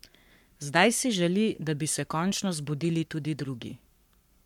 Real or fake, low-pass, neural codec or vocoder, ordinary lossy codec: fake; 19.8 kHz; codec, 44.1 kHz, 7.8 kbps, Pupu-Codec; MP3, 96 kbps